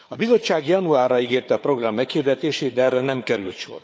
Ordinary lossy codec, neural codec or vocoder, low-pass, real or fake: none; codec, 16 kHz, 4 kbps, FunCodec, trained on Chinese and English, 50 frames a second; none; fake